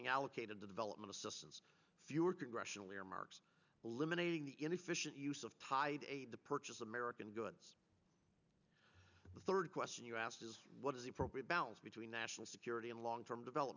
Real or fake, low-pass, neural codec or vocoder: real; 7.2 kHz; none